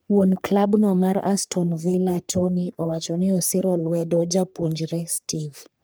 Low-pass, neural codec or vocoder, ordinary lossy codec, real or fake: none; codec, 44.1 kHz, 3.4 kbps, Pupu-Codec; none; fake